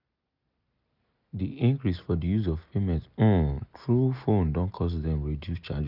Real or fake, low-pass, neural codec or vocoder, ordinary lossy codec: real; 5.4 kHz; none; none